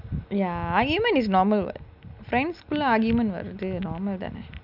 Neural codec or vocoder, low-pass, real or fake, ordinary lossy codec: none; 5.4 kHz; real; none